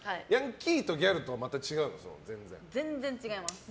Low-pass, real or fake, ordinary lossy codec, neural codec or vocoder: none; real; none; none